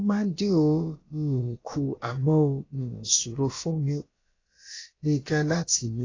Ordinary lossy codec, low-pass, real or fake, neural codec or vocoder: AAC, 32 kbps; 7.2 kHz; fake; codec, 16 kHz, about 1 kbps, DyCAST, with the encoder's durations